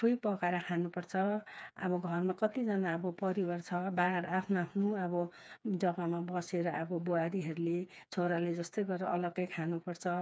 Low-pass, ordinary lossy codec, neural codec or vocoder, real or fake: none; none; codec, 16 kHz, 4 kbps, FreqCodec, smaller model; fake